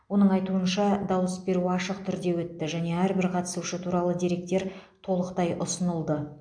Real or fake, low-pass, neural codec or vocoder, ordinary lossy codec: real; 9.9 kHz; none; AAC, 64 kbps